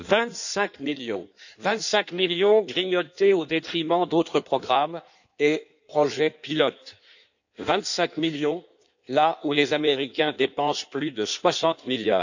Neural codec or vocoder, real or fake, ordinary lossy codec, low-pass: codec, 16 kHz in and 24 kHz out, 1.1 kbps, FireRedTTS-2 codec; fake; none; 7.2 kHz